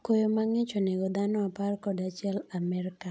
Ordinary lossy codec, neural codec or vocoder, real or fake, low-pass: none; none; real; none